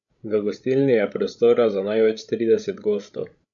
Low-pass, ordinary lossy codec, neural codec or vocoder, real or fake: 7.2 kHz; none; codec, 16 kHz, 16 kbps, FreqCodec, larger model; fake